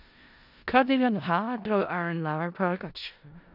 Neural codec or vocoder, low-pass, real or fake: codec, 16 kHz in and 24 kHz out, 0.4 kbps, LongCat-Audio-Codec, four codebook decoder; 5.4 kHz; fake